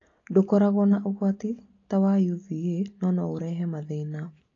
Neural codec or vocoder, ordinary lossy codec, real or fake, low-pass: none; AAC, 32 kbps; real; 7.2 kHz